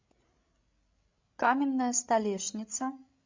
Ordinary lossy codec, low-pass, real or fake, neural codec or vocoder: MP3, 48 kbps; 7.2 kHz; fake; codec, 16 kHz, 16 kbps, FreqCodec, larger model